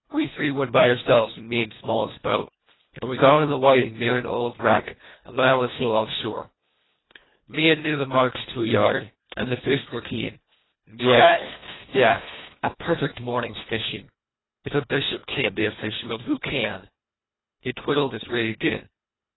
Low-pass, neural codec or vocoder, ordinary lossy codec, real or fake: 7.2 kHz; codec, 24 kHz, 1.5 kbps, HILCodec; AAC, 16 kbps; fake